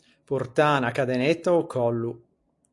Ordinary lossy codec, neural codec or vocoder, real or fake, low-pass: AAC, 64 kbps; none; real; 10.8 kHz